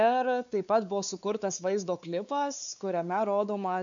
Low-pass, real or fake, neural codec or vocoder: 7.2 kHz; fake; codec, 16 kHz, 4 kbps, X-Codec, WavLM features, trained on Multilingual LibriSpeech